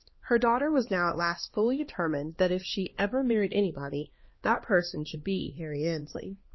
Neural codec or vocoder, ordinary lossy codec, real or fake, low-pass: codec, 16 kHz, 2 kbps, X-Codec, HuBERT features, trained on LibriSpeech; MP3, 24 kbps; fake; 7.2 kHz